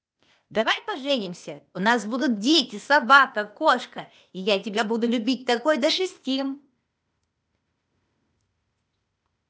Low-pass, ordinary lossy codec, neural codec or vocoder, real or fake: none; none; codec, 16 kHz, 0.8 kbps, ZipCodec; fake